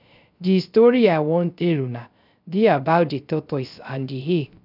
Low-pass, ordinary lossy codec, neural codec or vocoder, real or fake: 5.4 kHz; none; codec, 16 kHz, 0.3 kbps, FocalCodec; fake